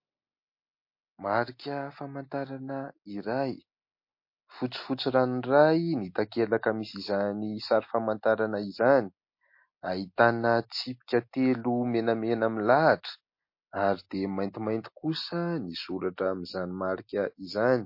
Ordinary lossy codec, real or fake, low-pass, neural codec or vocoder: MP3, 32 kbps; real; 5.4 kHz; none